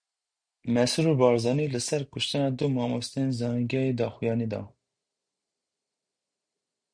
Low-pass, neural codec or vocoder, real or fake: 9.9 kHz; none; real